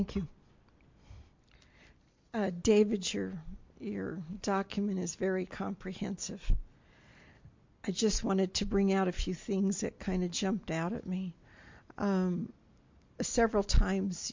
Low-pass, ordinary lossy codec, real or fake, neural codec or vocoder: 7.2 kHz; MP3, 48 kbps; real; none